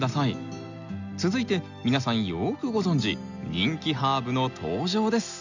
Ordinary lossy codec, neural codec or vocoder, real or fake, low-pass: none; none; real; 7.2 kHz